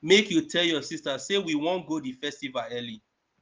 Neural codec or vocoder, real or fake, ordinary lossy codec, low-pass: none; real; Opus, 24 kbps; 7.2 kHz